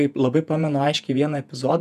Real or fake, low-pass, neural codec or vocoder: fake; 14.4 kHz; vocoder, 48 kHz, 128 mel bands, Vocos